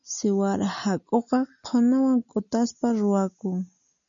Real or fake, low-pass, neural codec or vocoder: real; 7.2 kHz; none